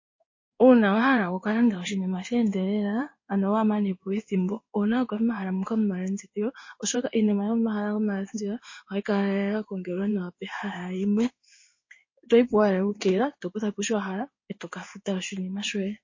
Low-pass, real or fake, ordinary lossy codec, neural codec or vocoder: 7.2 kHz; fake; MP3, 32 kbps; codec, 16 kHz in and 24 kHz out, 1 kbps, XY-Tokenizer